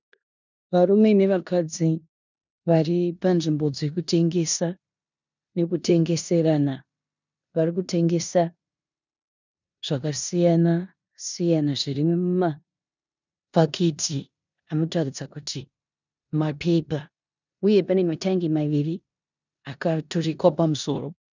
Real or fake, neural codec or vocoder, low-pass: fake; codec, 16 kHz in and 24 kHz out, 0.9 kbps, LongCat-Audio-Codec, four codebook decoder; 7.2 kHz